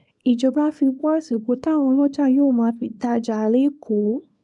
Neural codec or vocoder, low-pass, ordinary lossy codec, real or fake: codec, 24 kHz, 0.9 kbps, WavTokenizer, small release; 10.8 kHz; none; fake